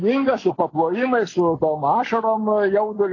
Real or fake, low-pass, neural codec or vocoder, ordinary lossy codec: real; 7.2 kHz; none; AAC, 32 kbps